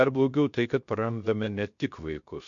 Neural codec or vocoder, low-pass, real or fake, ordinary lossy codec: codec, 16 kHz, 0.3 kbps, FocalCodec; 7.2 kHz; fake; MP3, 48 kbps